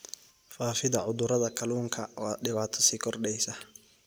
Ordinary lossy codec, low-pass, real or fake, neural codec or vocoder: none; none; real; none